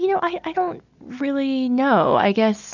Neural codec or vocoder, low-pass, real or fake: none; 7.2 kHz; real